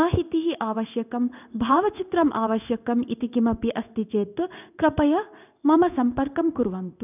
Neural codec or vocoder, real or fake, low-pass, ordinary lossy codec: codec, 16 kHz in and 24 kHz out, 1 kbps, XY-Tokenizer; fake; 3.6 kHz; none